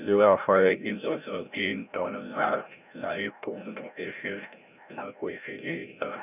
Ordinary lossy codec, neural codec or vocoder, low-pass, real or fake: none; codec, 16 kHz, 0.5 kbps, FreqCodec, larger model; 3.6 kHz; fake